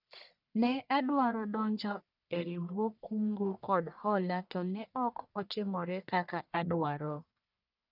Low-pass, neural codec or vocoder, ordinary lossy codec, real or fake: 5.4 kHz; codec, 44.1 kHz, 1.7 kbps, Pupu-Codec; none; fake